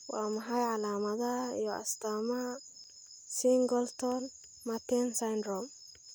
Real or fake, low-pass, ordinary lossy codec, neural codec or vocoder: real; none; none; none